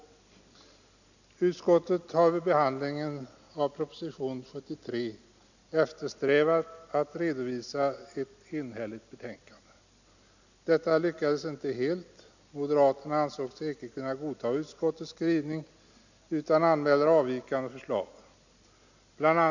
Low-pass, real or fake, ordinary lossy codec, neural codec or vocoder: 7.2 kHz; real; none; none